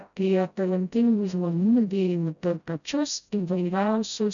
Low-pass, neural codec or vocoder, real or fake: 7.2 kHz; codec, 16 kHz, 0.5 kbps, FreqCodec, smaller model; fake